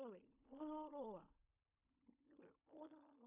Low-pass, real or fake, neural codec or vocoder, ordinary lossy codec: 3.6 kHz; fake; codec, 16 kHz in and 24 kHz out, 0.4 kbps, LongCat-Audio-Codec, fine tuned four codebook decoder; MP3, 32 kbps